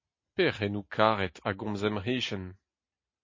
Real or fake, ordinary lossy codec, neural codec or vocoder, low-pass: real; MP3, 32 kbps; none; 7.2 kHz